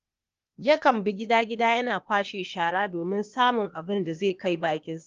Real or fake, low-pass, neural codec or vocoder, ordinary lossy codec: fake; 7.2 kHz; codec, 16 kHz, 0.8 kbps, ZipCodec; Opus, 32 kbps